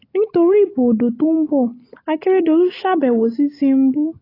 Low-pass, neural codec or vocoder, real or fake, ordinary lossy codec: 5.4 kHz; none; real; AAC, 24 kbps